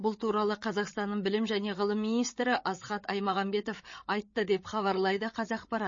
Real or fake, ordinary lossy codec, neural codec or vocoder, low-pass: fake; MP3, 32 kbps; codec, 16 kHz, 8 kbps, FreqCodec, larger model; 7.2 kHz